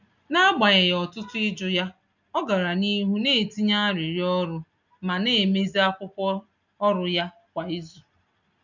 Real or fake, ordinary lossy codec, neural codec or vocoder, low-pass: real; none; none; 7.2 kHz